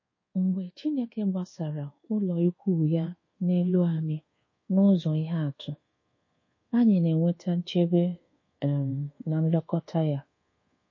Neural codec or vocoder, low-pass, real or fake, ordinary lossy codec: codec, 24 kHz, 1.2 kbps, DualCodec; 7.2 kHz; fake; MP3, 32 kbps